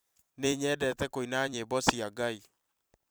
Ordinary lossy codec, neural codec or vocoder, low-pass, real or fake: none; vocoder, 44.1 kHz, 128 mel bands every 256 samples, BigVGAN v2; none; fake